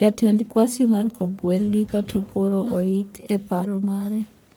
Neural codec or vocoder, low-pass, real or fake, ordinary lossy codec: codec, 44.1 kHz, 1.7 kbps, Pupu-Codec; none; fake; none